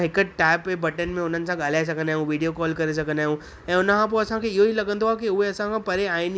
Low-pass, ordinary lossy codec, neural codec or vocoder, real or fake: none; none; none; real